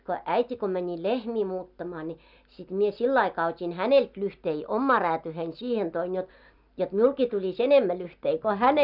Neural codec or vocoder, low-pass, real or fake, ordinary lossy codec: none; 5.4 kHz; real; none